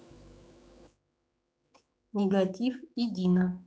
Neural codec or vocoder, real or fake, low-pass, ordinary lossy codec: codec, 16 kHz, 4 kbps, X-Codec, HuBERT features, trained on general audio; fake; none; none